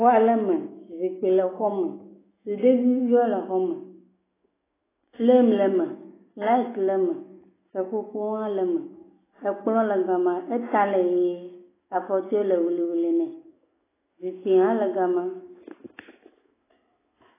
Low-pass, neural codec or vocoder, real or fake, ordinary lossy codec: 3.6 kHz; autoencoder, 48 kHz, 128 numbers a frame, DAC-VAE, trained on Japanese speech; fake; AAC, 16 kbps